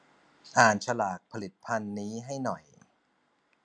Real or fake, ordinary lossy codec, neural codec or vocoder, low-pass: real; none; none; 9.9 kHz